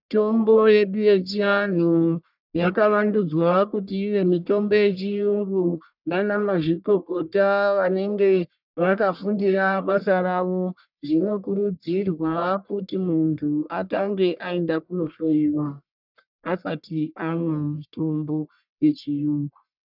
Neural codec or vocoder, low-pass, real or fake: codec, 44.1 kHz, 1.7 kbps, Pupu-Codec; 5.4 kHz; fake